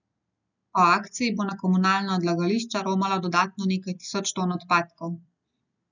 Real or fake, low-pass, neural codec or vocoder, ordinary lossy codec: real; 7.2 kHz; none; none